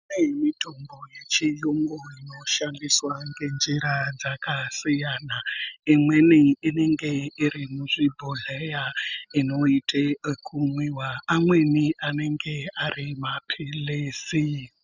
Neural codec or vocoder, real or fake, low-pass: none; real; 7.2 kHz